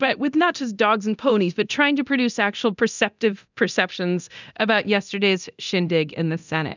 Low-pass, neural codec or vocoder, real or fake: 7.2 kHz; codec, 24 kHz, 0.9 kbps, DualCodec; fake